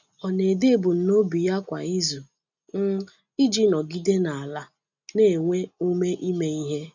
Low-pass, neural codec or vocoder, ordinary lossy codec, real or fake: 7.2 kHz; none; none; real